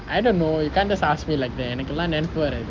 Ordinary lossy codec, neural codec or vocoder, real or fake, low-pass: Opus, 32 kbps; none; real; 7.2 kHz